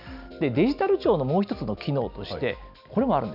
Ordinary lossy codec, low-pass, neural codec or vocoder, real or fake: none; 5.4 kHz; none; real